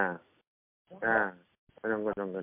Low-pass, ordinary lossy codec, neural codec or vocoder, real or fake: 3.6 kHz; MP3, 32 kbps; none; real